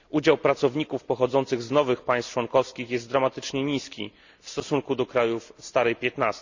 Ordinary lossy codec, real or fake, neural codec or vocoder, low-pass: Opus, 64 kbps; real; none; 7.2 kHz